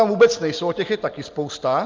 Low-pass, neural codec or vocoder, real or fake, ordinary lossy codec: 7.2 kHz; none; real; Opus, 32 kbps